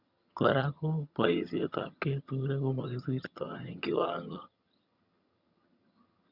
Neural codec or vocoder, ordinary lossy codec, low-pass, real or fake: vocoder, 22.05 kHz, 80 mel bands, HiFi-GAN; Opus, 64 kbps; 5.4 kHz; fake